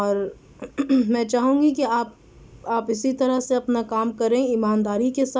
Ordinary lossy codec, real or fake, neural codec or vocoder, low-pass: none; real; none; none